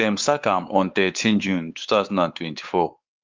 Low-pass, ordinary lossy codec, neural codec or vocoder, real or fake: 7.2 kHz; Opus, 32 kbps; codec, 16 kHz, 4 kbps, X-Codec, WavLM features, trained on Multilingual LibriSpeech; fake